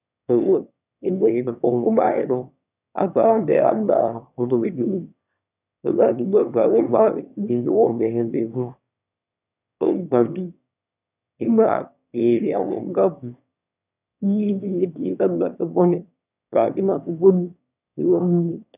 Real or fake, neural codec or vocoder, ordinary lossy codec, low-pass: fake; autoencoder, 22.05 kHz, a latent of 192 numbers a frame, VITS, trained on one speaker; none; 3.6 kHz